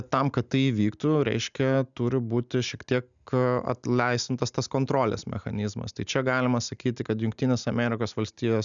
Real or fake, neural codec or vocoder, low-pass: real; none; 7.2 kHz